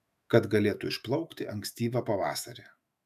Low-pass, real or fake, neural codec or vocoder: 14.4 kHz; fake; autoencoder, 48 kHz, 128 numbers a frame, DAC-VAE, trained on Japanese speech